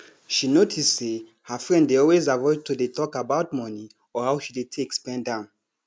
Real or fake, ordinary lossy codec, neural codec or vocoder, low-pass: real; none; none; none